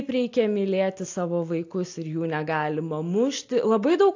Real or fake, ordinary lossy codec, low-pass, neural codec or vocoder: real; AAC, 48 kbps; 7.2 kHz; none